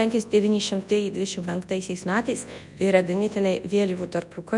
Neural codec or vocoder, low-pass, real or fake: codec, 24 kHz, 0.9 kbps, WavTokenizer, large speech release; 10.8 kHz; fake